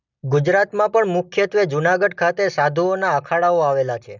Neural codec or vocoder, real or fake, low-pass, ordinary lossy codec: none; real; 7.2 kHz; none